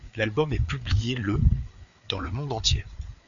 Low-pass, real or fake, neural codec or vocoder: 7.2 kHz; fake; codec, 16 kHz, 4 kbps, FreqCodec, larger model